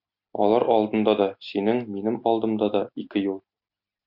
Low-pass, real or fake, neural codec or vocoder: 5.4 kHz; real; none